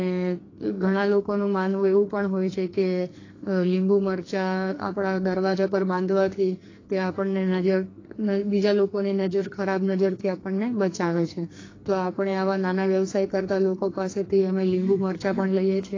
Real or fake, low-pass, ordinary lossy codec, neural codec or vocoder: fake; 7.2 kHz; AAC, 32 kbps; codec, 44.1 kHz, 2.6 kbps, SNAC